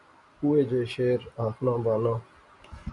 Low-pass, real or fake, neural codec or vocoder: 10.8 kHz; real; none